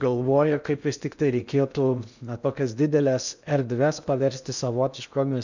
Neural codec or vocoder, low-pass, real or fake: codec, 16 kHz in and 24 kHz out, 0.6 kbps, FocalCodec, streaming, 2048 codes; 7.2 kHz; fake